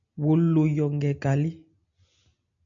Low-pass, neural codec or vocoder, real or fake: 7.2 kHz; none; real